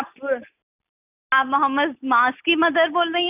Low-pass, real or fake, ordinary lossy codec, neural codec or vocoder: 3.6 kHz; real; none; none